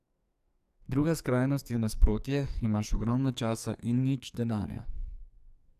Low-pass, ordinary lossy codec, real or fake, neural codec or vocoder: 14.4 kHz; none; fake; codec, 44.1 kHz, 2.6 kbps, SNAC